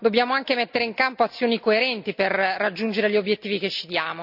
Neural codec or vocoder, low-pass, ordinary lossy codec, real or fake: none; 5.4 kHz; none; real